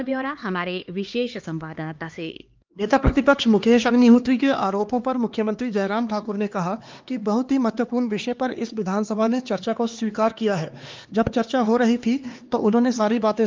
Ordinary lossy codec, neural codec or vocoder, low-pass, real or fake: Opus, 24 kbps; codec, 16 kHz, 2 kbps, X-Codec, HuBERT features, trained on LibriSpeech; 7.2 kHz; fake